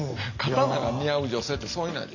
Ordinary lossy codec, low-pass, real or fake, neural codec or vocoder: none; 7.2 kHz; real; none